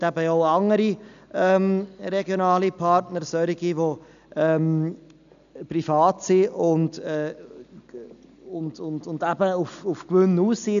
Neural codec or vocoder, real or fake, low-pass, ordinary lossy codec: none; real; 7.2 kHz; none